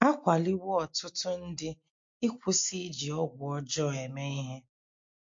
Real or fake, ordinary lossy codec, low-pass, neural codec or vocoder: real; MP3, 48 kbps; 7.2 kHz; none